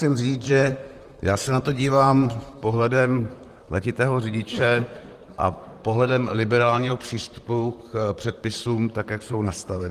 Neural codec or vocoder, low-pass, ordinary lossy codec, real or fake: vocoder, 44.1 kHz, 128 mel bands, Pupu-Vocoder; 14.4 kHz; Opus, 24 kbps; fake